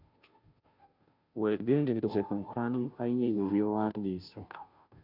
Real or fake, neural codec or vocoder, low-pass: fake; codec, 16 kHz, 0.5 kbps, FunCodec, trained on Chinese and English, 25 frames a second; 5.4 kHz